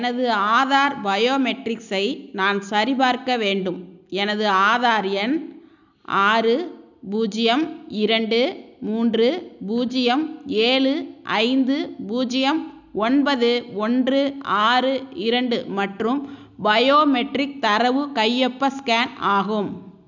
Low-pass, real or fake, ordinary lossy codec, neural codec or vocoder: 7.2 kHz; real; none; none